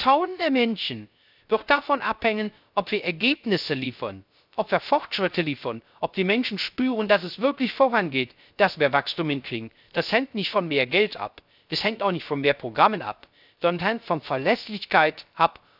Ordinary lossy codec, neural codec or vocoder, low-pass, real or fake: none; codec, 16 kHz, 0.3 kbps, FocalCodec; 5.4 kHz; fake